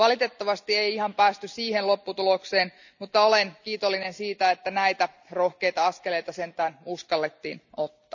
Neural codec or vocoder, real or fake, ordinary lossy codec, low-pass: none; real; none; 7.2 kHz